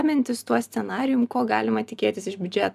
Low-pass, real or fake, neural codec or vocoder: 14.4 kHz; real; none